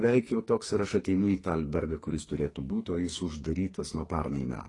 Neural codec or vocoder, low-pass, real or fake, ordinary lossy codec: codec, 44.1 kHz, 2.6 kbps, SNAC; 10.8 kHz; fake; AAC, 32 kbps